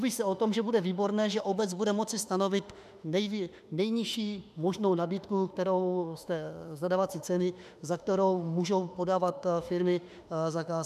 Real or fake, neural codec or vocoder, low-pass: fake; autoencoder, 48 kHz, 32 numbers a frame, DAC-VAE, trained on Japanese speech; 14.4 kHz